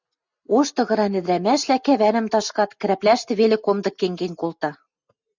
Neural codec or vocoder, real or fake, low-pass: none; real; 7.2 kHz